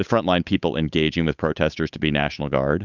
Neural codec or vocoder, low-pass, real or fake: codec, 16 kHz, 8 kbps, FunCodec, trained on Chinese and English, 25 frames a second; 7.2 kHz; fake